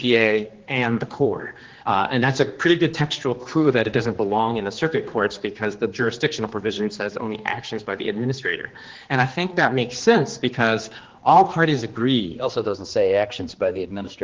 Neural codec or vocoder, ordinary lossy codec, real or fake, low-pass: codec, 16 kHz, 2 kbps, X-Codec, HuBERT features, trained on general audio; Opus, 16 kbps; fake; 7.2 kHz